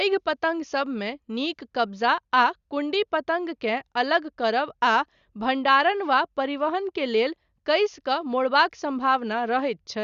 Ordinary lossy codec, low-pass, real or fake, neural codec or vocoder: Opus, 64 kbps; 7.2 kHz; real; none